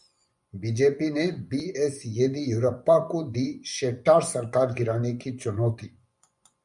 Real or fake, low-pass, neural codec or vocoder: fake; 10.8 kHz; vocoder, 24 kHz, 100 mel bands, Vocos